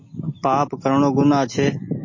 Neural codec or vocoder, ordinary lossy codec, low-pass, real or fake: none; MP3, 32 kbps; 7.2 kHz; real